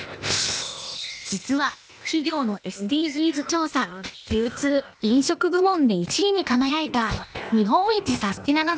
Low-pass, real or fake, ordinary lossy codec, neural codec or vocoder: none; fake; none; codec, 16 kHz, 0.8 kbps, ZipCodec